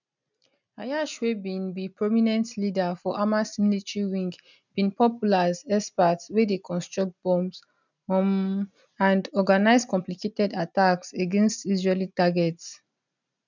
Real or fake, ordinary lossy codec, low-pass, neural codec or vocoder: real; none; 7.2 kHz; none